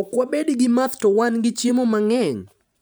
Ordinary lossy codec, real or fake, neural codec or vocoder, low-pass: none; fake; vocoder, 44.1 kHz, 128 mel bands every 512 samples, BigVGAN v2; none